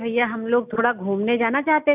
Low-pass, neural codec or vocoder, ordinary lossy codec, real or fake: 3.6 kHz; none; none; real